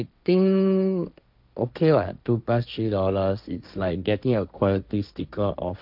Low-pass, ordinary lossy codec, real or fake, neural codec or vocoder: 5.4 kHz; none; fake; codec, 16 kHz, 1.1 kbps, Voila-Tokenizer